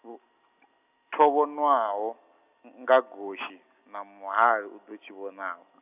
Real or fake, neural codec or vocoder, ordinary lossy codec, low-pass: real; none; none; 3.6 kHz